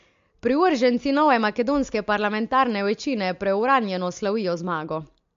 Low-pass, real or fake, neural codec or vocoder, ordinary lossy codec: 7.2 kHz; real; none; MP3, 48 kbps